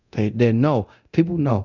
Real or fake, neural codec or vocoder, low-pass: fake; codec, 24 kHz, 0.9 kbps, DualCodec; 7.2 kHz